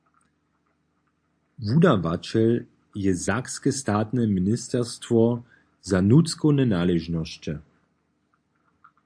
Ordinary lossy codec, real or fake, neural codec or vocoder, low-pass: AAC, 64 kbps; real; none; 9.9 kHz